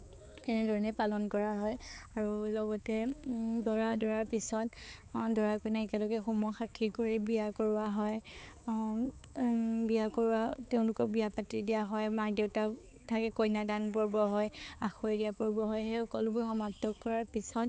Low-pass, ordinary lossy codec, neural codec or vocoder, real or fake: none; none; codec, 16 kHz, 4 kbps, X-Codec, HuBERT features, trained on balanced general audio; fake